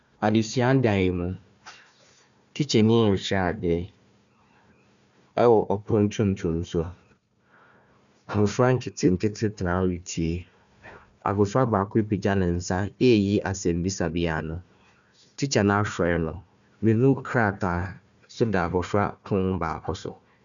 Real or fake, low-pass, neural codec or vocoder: fake; 7.2 kHz; codec, 16 kHz, 1 kbps, FunCodec, trained on Chinese and English, 50 frames a second